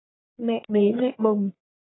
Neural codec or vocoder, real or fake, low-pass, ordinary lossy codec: codec, 44.1 kHz, 1.7 kbps, Pupu-Codec; fake; 7.2 kHz; AAC, 16 kbps